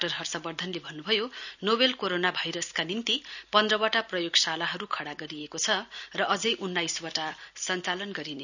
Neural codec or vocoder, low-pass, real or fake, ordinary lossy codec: none; 7.2 kHz; real; none